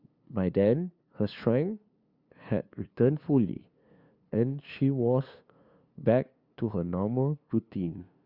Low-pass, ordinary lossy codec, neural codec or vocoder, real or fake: 5.4 kHz; Opus, 64 kbps; codec, 16 kHz, 2 kbps, FunCodec, trained on LibriTTS, 25 frames a second; fake